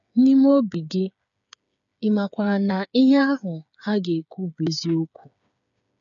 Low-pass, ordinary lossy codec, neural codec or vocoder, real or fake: 7.2 kHz; none; codec, 16 kHz, 8 kbps, FreqCodec, smaller model; fake